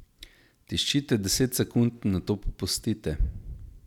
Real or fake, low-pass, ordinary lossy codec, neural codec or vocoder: real; 19.8 kHz; none; none